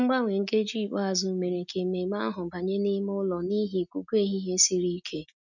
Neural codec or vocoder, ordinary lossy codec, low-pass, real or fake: none; none; 7.2 kHz; real